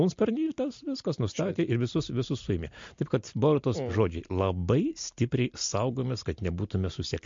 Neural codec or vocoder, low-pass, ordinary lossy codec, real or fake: none; 7.2 kHz; MP3, 48 kbps; real